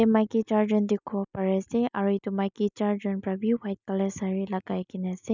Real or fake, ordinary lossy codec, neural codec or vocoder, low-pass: real; none; none; 7.2 kHz